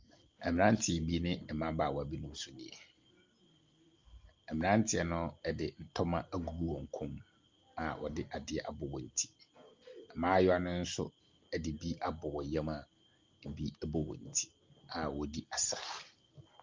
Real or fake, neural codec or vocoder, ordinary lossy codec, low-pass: real; none; Opus, 24 kbps; 7.2 kHz